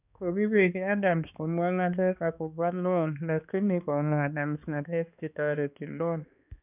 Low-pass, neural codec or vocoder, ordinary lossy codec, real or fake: 3.6 kHz; codec, 16 kHz, 2 kbps, X-Codec, HuBERT features, trained on balanced general audio; none; fake